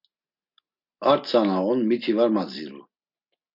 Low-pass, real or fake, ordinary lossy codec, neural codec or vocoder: 5.4 kHz; real; MP3, 48 kbps; none